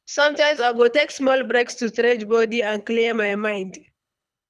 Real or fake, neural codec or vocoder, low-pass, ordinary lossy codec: fake; codec, 24 kHz, 3 kbps, HILCodec; none; none